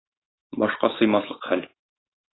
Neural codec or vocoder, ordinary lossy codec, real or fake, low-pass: autoencoder, 48 kHz, 128 numbers a frame, DAC-VAE, trained on Japanese speech; AAC, 16 kbps; fake; 7.2 kHz